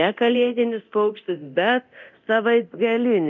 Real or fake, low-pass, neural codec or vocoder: fake; 7.2 kHz; codec, 24 kHz, 0.9 kbps, DualCodec